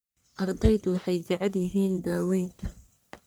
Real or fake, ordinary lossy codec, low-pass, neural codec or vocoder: fake; none; none; codec, 44.1 kHz, 1.7 kbps, Pupu-Codec